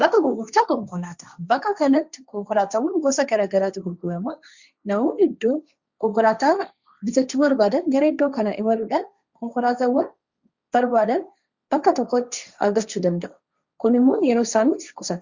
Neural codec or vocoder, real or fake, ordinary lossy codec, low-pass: codec, 16 kHz, 1.1 kbps, Voila-Tokenizer; fake; Opus, 64 kbps; 7.2 kHz